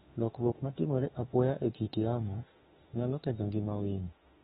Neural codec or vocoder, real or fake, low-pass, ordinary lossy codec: autoencoder, 48 kHz, 32 numbers a frame, DAC-VAE, trained on Japanese speech; fake; 19.8 kHz; AAC, 16 kbps